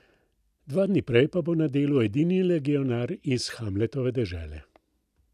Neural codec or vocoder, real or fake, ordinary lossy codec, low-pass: none; real; none; 14.4 kHz